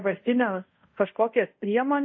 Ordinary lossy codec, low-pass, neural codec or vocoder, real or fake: MP3, 32 kbps; 7.2 kHz; codec, 24 kHz, 0.5 kbps, DualCodec; fake